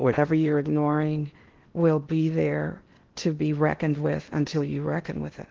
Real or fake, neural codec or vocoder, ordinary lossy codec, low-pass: fake; codec, 16 kHz in and 24 kHz out, 0.8 kbps, FocalCodec, streaming, 65536 codes; Opus, 16 kbps; 7.2 kHz